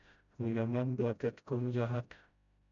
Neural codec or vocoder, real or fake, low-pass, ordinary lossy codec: codec, 16 kHz, 0.5 kbps, FreqCodec, smaller model; fake; 7.2 kHz; MP3, 64 kbps